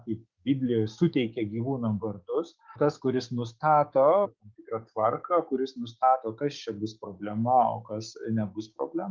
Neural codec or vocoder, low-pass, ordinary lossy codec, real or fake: codec, 16 kHz, 6 kbps, DAC; 7.2 kHz; Opus, 32 kbps; fake